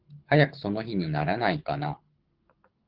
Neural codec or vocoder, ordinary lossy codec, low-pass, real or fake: codec, 24 kHz, 6 kbps, HILCodec; Opus, 16 kbps; 5.4 kHz; fake